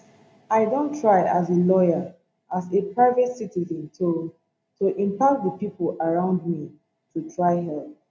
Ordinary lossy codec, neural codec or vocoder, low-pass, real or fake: none; none; none; real